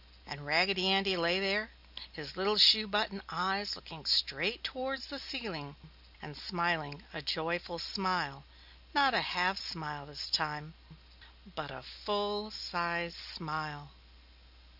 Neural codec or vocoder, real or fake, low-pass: none; real; 5.4 kHz